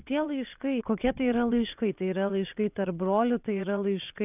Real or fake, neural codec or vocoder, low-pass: fake; vocoder, 22.05 kHz, 80 mel bands, WaveNeXt; 3.6 kHz